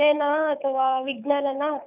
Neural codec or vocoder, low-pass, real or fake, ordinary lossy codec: codec, 24 kHz, 6 kbps, HILCodec; 3.6 kHz; fake; none